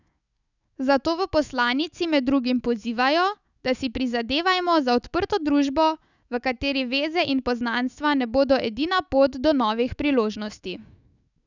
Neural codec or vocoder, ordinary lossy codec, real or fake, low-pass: autoencoder, 48 kHz, 128 numbers a frame, DAC-VAE, trained on Japanese speech; none; fake; 7.2 kHz